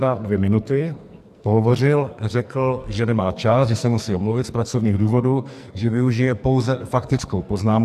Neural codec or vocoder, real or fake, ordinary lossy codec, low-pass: codec, 44.1 kHz, 2.6 kbps, SNAC; fake; AAC, 96 kbps; 14.4 kHz